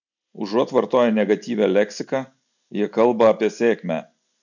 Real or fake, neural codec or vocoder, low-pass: real; none; 7.2 kHz